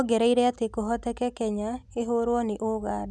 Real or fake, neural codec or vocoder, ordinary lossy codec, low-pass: real; none; none; none